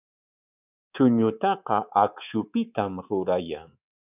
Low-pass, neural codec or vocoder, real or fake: 3.6 kHz; codec, 24 kHz, 3.1 kbps, DualCodec; fake